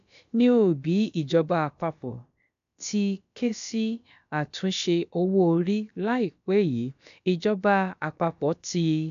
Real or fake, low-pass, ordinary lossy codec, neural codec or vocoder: fake; 7.2 kHz; AAC, 96 kbps; codec, 16 kHz, about 1 kbps, DyCAST, with the encoder's durations